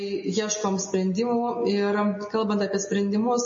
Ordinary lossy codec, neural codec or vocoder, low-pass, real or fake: MP3, 32 kbps; none; 7.2 kHz; real